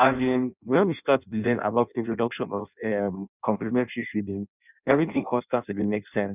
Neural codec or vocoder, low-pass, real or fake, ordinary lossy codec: codec, 16 kHz in and 24 kHz out, 0.6 kbps, FireRedTTS-2 codec; 3.6 kHz; fake; none